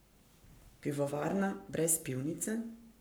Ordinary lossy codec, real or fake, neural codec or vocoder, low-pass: none; fake; codec, 44.1 kHz, 7.8 kbps, Pupu-Codec; none